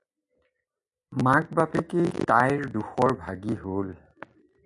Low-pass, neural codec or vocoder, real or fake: 10.8 kHz; none; real